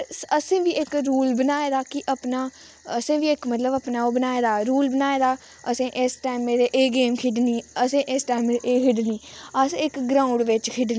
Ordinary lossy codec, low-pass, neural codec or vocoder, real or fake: none; none; none; real